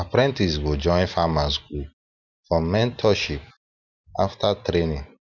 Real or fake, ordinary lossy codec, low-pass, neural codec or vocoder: real; none; 7.2 kHz; none